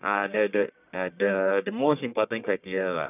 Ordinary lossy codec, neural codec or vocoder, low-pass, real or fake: none; codec, 44.1 kHz, 1.7 kbps, Pupu-Codec; 3.6 kHz; fake